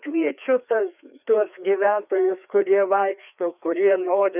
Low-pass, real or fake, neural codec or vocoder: 3.6 kHz; fake; codec, 16 kHz, 2 kbps, FreqCodec, larger model